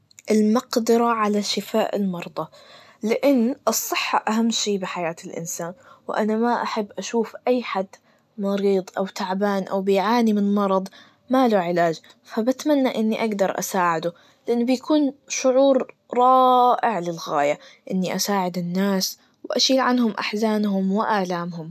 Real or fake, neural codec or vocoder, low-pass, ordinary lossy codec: real; none; 14.4 kHz; none